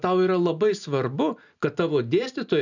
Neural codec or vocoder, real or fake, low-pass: none; real; 7.2 kHz